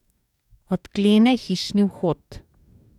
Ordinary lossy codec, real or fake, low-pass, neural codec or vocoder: none; fake; 19.8 kHz; codec, 44.1 kHz, 2.6 kbps, DAC